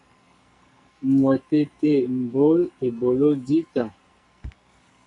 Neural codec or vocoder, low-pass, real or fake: codec, 44.1 kHz, 2.6 kbps, SNAC; 10.8 kHz; fake